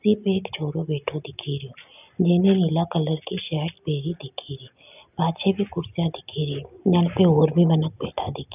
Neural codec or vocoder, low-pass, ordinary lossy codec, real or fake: none; 3.6 kHz; none; real